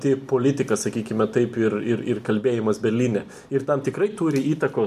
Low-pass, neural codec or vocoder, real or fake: 14.4 kHz; none; real